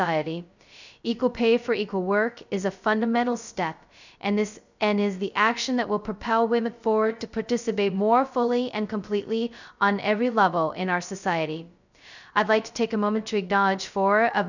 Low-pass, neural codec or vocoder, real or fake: 7.2 kHz; codec, 16 kHz, 0.2 kbps, FocalCodec; fake